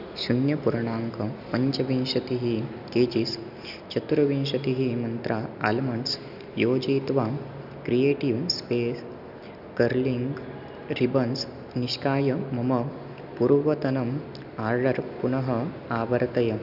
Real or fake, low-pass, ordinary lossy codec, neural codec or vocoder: real; 5.4 kHz; none; none